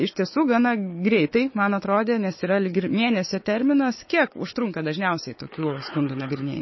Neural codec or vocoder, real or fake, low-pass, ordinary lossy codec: codec, 16 kHz, 4 kbps, FunCodec, trained on Chinese and English, 50 frames a second; fake; 7.2 kHz; MP3, 24 kbps